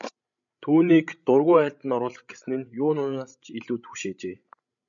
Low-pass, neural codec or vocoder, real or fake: 7.2 kHz; codec, 16 kHz, 16 kbps, FreqCodec, larger model; fake